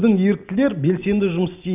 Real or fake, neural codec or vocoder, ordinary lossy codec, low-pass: real; none; none; 3.6 kHz